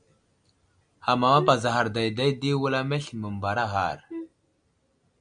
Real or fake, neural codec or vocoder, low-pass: real; none; 9.9 kHz